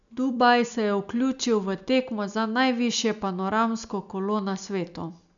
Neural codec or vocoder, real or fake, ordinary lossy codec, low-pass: none; real; none; 7.2 kHz